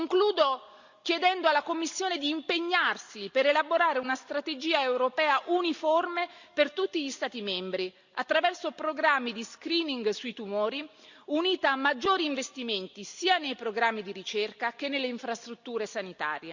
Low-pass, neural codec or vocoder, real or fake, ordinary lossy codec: 7.2 kHz; none; real; Opus, 64 kbps